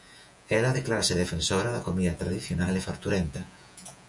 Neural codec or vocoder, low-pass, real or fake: vocoder, 48 kHz, 128 mel bands, Vocos; 10.8 kHz; fake